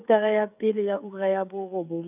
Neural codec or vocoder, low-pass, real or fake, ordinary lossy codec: codec, 16 kHz, 8 kbps, FreqCodec, smaller model; 3.6 kHz; fake; none